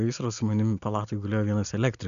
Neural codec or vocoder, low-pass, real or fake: none; 7.2 kHz; real